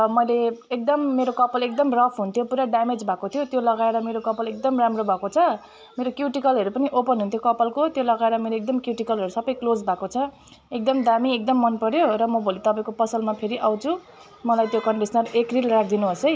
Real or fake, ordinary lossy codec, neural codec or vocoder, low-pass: real; none; none; none